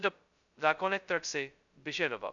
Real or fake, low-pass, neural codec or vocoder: fake; 7.2 kHz; codec, 16 kHz, 0.2 kbps, FocalCodec